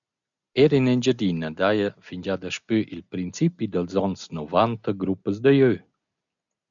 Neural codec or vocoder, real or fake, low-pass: none; real; 7.2 kHz